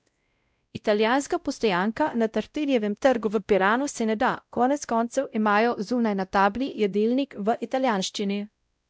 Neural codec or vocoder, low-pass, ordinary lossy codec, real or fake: codec, 16 kHz, 0.5 kbps, X-Codec, WavLM features, trained on Multilingual LibriSpeech; none; none; fake